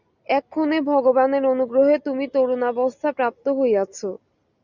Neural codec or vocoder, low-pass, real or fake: none; 7.2 kHz; real